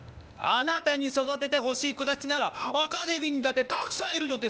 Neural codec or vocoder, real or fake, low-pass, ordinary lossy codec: codec, 16 kHz, 0.8 kbps, ZipCodec; fake; none; none